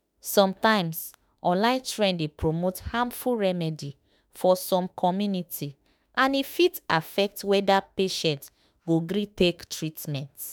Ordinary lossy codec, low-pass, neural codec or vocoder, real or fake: none; none; autoencoder, 48 kHz, 32 numbers a frame, DAC-VAE, trained on Japanese speech; fake